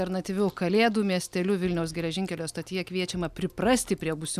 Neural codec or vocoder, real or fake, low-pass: none; real; 14.4 kHz